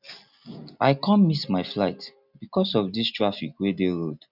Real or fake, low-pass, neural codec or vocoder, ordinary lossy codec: real; 5.4 kHz; none; none